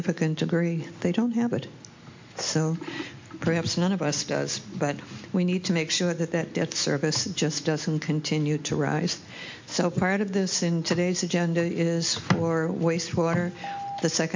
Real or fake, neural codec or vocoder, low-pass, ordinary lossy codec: real; none; 7.2 kHz; MP3, 48 kbps